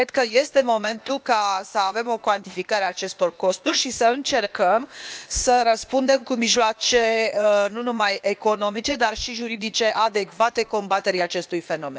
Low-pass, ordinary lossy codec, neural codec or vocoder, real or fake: none; none; codec, 16 kHz, 0.8 kbps, ZipCodec; fake